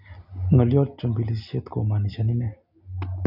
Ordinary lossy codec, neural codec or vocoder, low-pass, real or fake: none; none; 5.4 kHz; real